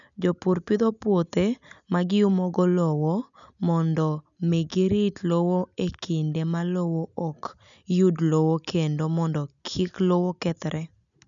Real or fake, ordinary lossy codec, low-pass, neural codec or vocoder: real; none; 7.2 kHz; none